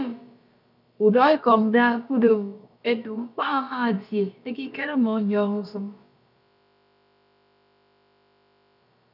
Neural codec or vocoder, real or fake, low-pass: codec, 16 kHz, about 1 kbps, DyCAST, with the encoder's durations; fake; 5.4 kHz